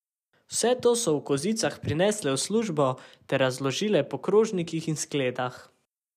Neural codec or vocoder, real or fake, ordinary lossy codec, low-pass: none; real; none; 14.4 kHz